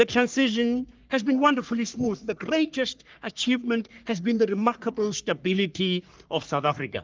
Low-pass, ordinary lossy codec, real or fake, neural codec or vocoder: 7.2 kHz; Opus, 24 kbps; fake; codec, 44.1 kHz, 3.4 kbps, Pupu-Codec